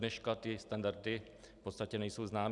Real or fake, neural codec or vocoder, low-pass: real; none; 10.8 kHz